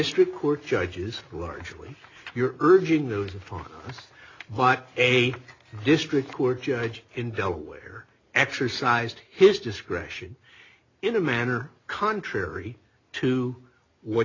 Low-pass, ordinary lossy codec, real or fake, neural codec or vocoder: 7.2 kHz; AAC, 32 kbps; real; none